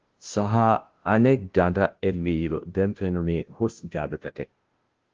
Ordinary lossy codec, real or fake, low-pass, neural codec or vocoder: Opus, 16 kbps; fake; 7.2 kHz; codec, 16 kHz, 0.5 kbps, FunCodec, trained on Chinese and English, 25 frames a second